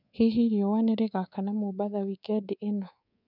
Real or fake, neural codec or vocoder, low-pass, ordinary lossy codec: fake; codec, 24 kHz, 3.1 kbps, DualCodec; 5.4 kHz; none